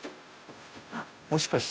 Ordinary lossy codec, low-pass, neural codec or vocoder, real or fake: none; none; codec, 16 kHz, 0.5 kbps, FunCodec, trained on Chinese and English, 25 frames a second; fake